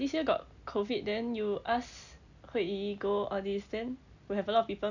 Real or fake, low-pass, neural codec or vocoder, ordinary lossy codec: real; 7.2 kHz; none; none